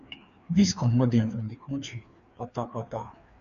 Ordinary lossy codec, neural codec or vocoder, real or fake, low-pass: none; codec, 16 kHz, 2 kbps, FreqCodec, larger model; fake; 7.2 kHz